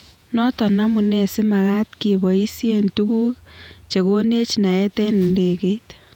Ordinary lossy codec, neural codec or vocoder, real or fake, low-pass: none; vocoder, 48 kHz, 128 mel bands, Vocos; fake; 19.8 kHz